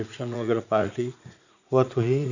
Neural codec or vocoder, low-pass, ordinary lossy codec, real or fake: vocoder, 44.1 kHz, 80 mel bands, Vocos; 7.2 kHz; none; fake